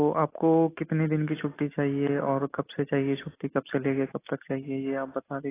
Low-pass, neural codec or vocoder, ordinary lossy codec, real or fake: 3.6 kHz; none; AAC, 16 kbps; real